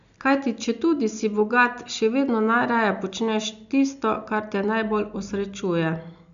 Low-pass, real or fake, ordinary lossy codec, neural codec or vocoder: 7.2 kHz; real; AAC, 96 kbps; none